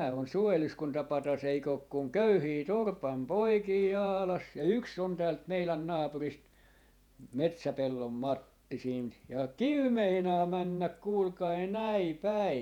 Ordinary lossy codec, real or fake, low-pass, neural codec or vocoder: none; fake; 19.8 kHz; vocoder, 48 kHz, 128 mel bands, Vocos